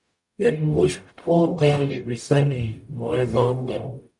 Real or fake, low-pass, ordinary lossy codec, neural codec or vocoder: fake; 10.8 kHz; MP3, 64 kbps; codec, 44.1 kHz, 0.9 kbps, DAC